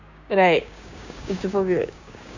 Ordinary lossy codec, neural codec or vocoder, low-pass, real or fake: none; codec, 16 kHz, 6 kbps, DAC; 7.2 kHz; fake